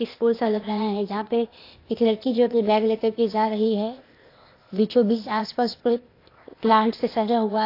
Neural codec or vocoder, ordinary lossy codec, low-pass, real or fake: codec, 16 kHz, 0.8 kbps, ZipCodec; AAC, 32 kbps; 5.4 kHz; fake